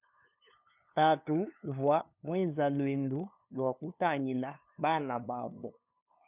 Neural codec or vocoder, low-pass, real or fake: codec, 16 kHz, 2 kbps, FunCodec, trained on LibriTTS, 25 frames a second; 3.6 kHz; fake